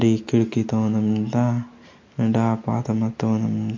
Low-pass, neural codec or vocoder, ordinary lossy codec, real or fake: 7.2 kHz; none; MP3, 48 kbps; real